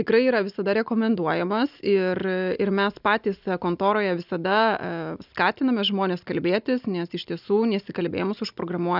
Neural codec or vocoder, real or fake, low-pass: none; real; 5.4 kHz